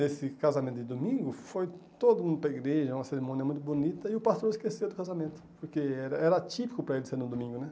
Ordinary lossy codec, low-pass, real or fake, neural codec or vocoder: none; none; real; none